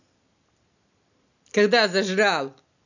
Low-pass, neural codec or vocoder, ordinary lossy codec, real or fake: 7.2 kHz; none; none; real